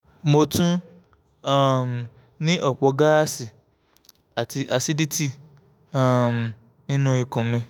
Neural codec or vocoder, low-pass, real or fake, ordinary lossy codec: autoencoder, 48 kHz, 32 numbers a frame, DAC-VAE, trained on Japanese speech; none; fake; none